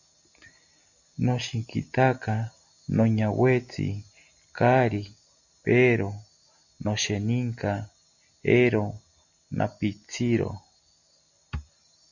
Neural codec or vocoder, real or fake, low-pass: none; real; 7.2 kHz